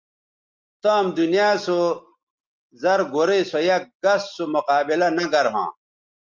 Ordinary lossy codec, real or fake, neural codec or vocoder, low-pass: Opus, 32 kbps; real; none; 7.2 kHz